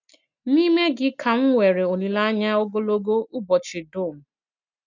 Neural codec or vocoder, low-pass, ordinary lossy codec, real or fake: none; 7.2 kHz; none; real